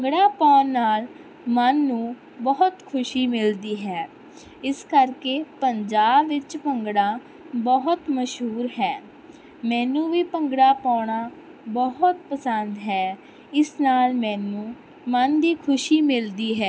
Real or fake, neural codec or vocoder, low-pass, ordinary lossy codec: real; none; none; none